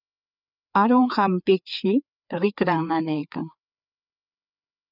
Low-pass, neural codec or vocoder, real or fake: 5.4 kHz; codec, 16 kHz, 4 kbps, FreqCodec, larger model; fake